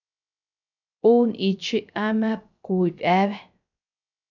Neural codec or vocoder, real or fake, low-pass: codec, 16 kHz, 0.3 kbps, FocalCodec; fake; 7.2 kHz